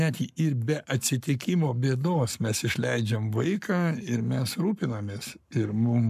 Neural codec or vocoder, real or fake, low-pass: codec, 44.1 kHz, 7.8 kbps, Pupu-Codec; fake; 14.4 kHz